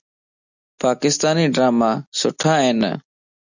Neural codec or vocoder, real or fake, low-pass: none; real; 7.2 kHz